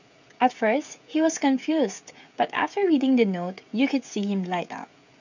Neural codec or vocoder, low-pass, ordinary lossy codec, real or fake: codec, 16 kHz, 16 kbps, FreqCodec, smaller model; 7.2 kHz; none; fake